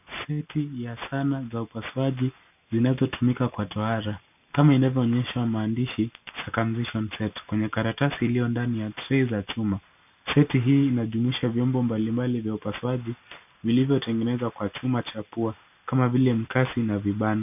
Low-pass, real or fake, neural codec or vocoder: 3.6 kHz; real; none